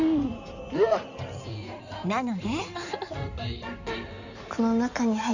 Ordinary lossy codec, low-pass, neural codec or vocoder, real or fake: none; 7.2 kHz; codec, 16 kHz in and 24 kHz out, 2.2 kbps, FireRedTTS-2 codec; fake